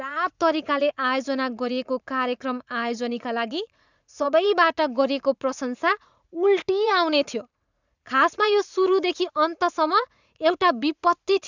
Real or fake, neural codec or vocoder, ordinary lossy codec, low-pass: fake; vocoder, 44.1 kHz, 80 mel bands, Vocos; none; 7.2 kHz